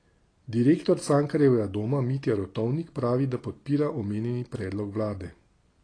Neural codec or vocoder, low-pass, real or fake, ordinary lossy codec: none; 9.9 kHz; real; AAC, 32 kbps